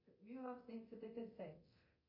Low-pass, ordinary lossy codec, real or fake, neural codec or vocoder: 5.4 kHz; MP3, 32 kbps; fake; codec, 24 kHz, 0.9 kbps, DualCodec